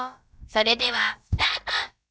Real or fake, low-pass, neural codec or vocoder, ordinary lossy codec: fake; none; codec, 16 kHz, about 1 kbps, DyCAST, with the encoder's durations; none